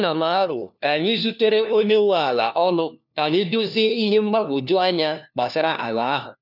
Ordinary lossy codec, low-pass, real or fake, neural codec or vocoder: none; 5.4 kHz; fake; codec, 16 kHz, 1 kbps, FunCodec, trained on LibriTTS, 50 frames a second